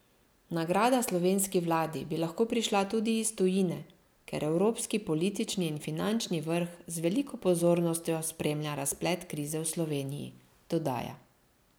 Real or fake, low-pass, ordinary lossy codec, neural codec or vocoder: real; none; none; none